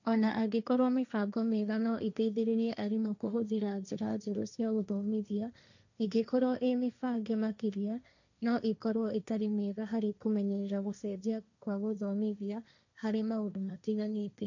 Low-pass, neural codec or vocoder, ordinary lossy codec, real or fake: 7.2 kHz; codec, 16 kHz, 1.1 kbps, Voila-Tokenizer; none; fake